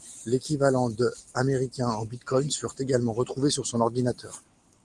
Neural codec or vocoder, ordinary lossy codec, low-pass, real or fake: none; Opus, 24 kbps; 10.8 kHz; real